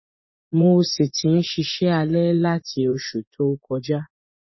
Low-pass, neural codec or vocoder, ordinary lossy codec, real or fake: 7.2 kHz; vocoder, 24 kHz, 100 mel bands, Vocos; MP3, 24 kbps; fake